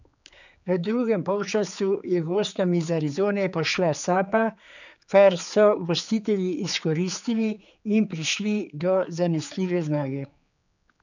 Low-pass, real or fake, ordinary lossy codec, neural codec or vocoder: 7.2 kHz; fake; none; codec, 16 kHz, 4 kbps, X-Codec, HuBERT features, trained on general audio